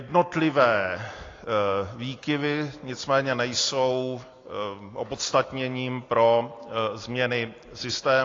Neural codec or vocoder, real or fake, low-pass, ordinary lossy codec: none; real; 7.2 kHz; AAC, 32 kbps